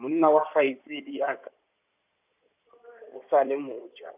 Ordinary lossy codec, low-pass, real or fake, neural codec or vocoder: none; 3.6 kHz; fake; codec, 16 kHz in and 24 kHz out, 2.2 kbps, FireRedTTS-2 codec